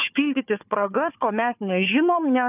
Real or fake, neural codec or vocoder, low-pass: fake; codec, 16 kHz, 4 kbps, FunCodec, trained on Chinese and English, 50 frames a second; 3.6 kHz